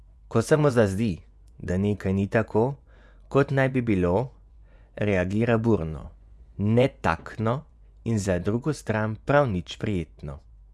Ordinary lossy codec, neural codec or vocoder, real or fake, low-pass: none; vocoder, 24 kHz, 100 mel bands, Vocos; fake; none